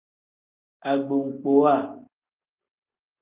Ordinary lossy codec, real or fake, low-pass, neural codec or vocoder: Opus, 24 kbps; real; 3.6 kHz; none